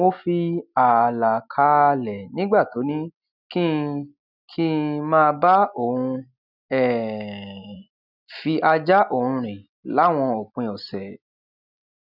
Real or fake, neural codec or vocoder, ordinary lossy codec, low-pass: real; none; none; 5.4 kHz